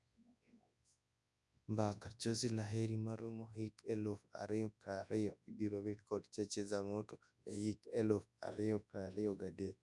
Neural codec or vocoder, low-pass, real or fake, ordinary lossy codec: codec, 24 kHz, 0.9 kbps, WavTokenizer, large speech release; 10.8 kHz; fake; none